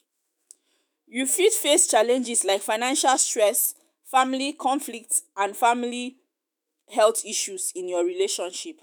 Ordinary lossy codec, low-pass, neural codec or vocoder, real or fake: none; none; autoencoder, 48 kHz, 128 numbers a frame, DAC-VAE, trained on Japanese speech; fake